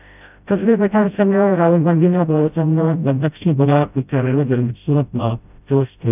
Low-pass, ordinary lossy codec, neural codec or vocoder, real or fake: 3.6 kHz; none; codec, 16 kHz, 0.5 kbps, FreqCodec, smaller model; fake